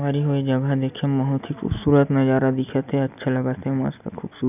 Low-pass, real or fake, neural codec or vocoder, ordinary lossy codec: 3.6 kHz; real; none; none